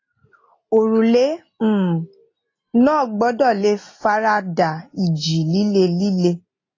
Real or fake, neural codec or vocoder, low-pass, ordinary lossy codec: real; none; 7.2 kHz; AAC, 32 kbps